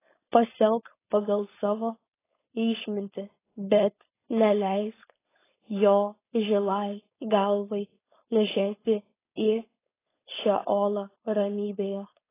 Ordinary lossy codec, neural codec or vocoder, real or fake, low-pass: AAC, 16 kbps; codec, 16 kHz, 4.8 kbps, FACodec; fake; 3.6 kHz